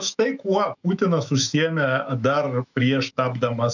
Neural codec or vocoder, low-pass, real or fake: autoencoder, 48 kHz, 128 numbers a frame, DAC-VAE, trained on Japanese speech; 7.2 kHz; fake